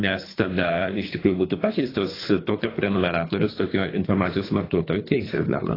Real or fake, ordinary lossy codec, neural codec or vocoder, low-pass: fake; AAC, 24 kbps; codec, 24 kHz, 3 kbps, HILCodec; 5.4 kHz